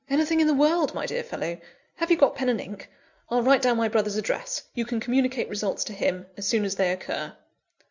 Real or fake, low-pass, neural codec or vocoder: real; 7.2 kHz; none